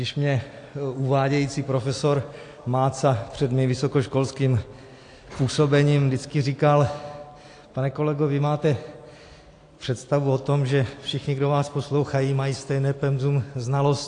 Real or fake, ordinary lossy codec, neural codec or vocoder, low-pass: real; AAC, 48 kbps; none; 9.9 kHz